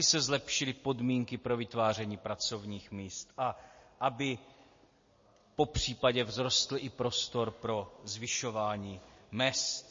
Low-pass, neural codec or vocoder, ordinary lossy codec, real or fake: 7.2 kHz; none; MP3, 32 kbps; real